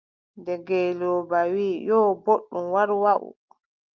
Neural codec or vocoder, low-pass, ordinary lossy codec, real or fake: none; 7.2 kHz; Opus, 24 kbps; real